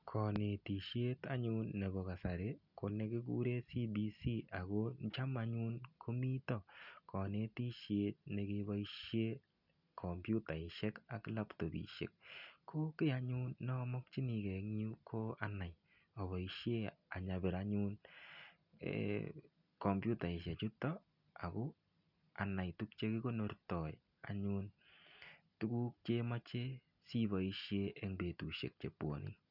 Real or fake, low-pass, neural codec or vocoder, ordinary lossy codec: real; 5.4 kHz; none; none